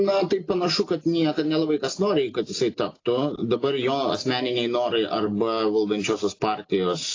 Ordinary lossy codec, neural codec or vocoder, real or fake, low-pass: AAC, 32 kbps; none; real; 7.2 kHz